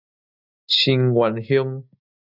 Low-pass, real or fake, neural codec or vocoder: 5.4 kHz; real; none